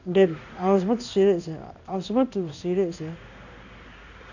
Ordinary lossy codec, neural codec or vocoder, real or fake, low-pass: none; codec, 16 kHz in and 24 kHz out, 1 kbps, XY-Tokenizer; fake; 7.2 kHz